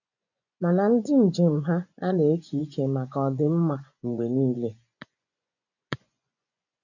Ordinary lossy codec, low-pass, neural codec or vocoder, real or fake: none; 7.2 kHz; vocoder, 24 kHz, 100 mel bands, Vocos; fake